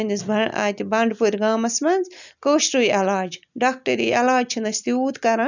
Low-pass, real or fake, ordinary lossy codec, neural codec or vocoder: 7.2 kHz; real; none; none